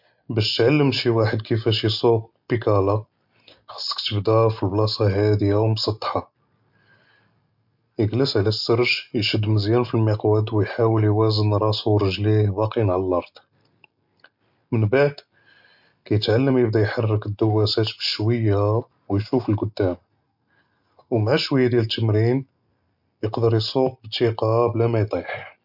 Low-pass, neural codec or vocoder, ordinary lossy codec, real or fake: 5.4 kHz; none; MP3, 48 kbps; real